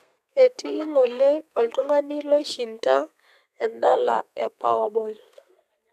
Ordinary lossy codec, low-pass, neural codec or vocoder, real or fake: none; 14.4 kHz; codec, 32 kHz, 1.9 kbps, SNAC; fake